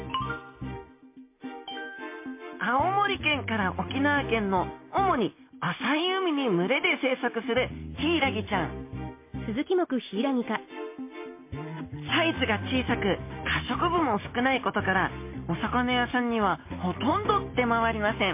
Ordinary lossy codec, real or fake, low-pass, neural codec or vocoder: MP3, 24 kbps; real; 3.6 kHz; none